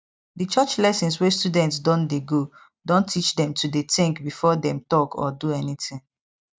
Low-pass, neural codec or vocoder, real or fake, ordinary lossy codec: none; none; real; none